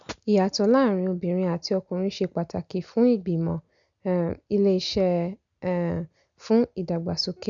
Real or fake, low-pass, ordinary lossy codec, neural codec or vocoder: real; 7.2 kHz; none; none